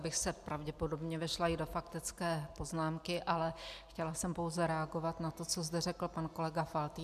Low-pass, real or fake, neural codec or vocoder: 14.4 kHz; real; none